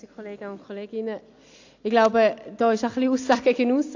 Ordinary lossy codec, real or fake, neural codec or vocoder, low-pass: AAC, 48 kbps; real; none; 7.2 kHz